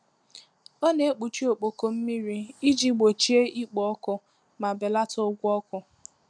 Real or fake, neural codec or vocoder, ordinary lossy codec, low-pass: real; none; none; 9.9 kHz